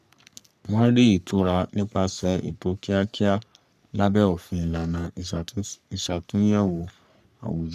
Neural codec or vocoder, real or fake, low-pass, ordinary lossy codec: codec, 44.1 kHz, 3.4 kbps, Pupu-Codec; fake; 14.4 kHz; none